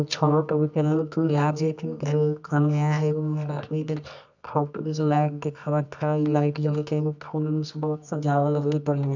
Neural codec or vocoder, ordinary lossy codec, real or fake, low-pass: codec, 24 kHz, 0.9 kbps, WavTokenizer, medium music audio release; none; fake; 7.2 kHz